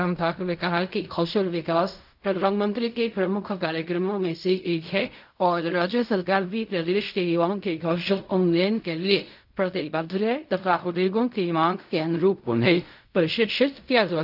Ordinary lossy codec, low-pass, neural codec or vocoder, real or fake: none; 5.4 kHz; codec, 16 kHz in and 24 kHz out, 0.4 kbps, LongCat-Audio-Codec, fine tuned four codebook decoder; fake